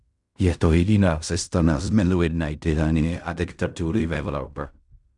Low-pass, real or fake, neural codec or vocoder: 10.8 kHz; fake; codec, 16 kHz in and 24 kHz out, 0.4 kbps, LongCat-Audio-Codec, fine tuned four codebook decoder